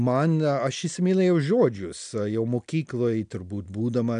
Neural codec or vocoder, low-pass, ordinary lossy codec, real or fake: none; 9.9 kHz; MP3, 64 kbps; real